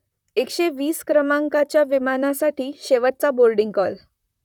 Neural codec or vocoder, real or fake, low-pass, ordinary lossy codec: vocoder, 44.1 kHz, 128 mel bands, Pupu-Vocoder; fake; 19.8 kHz; none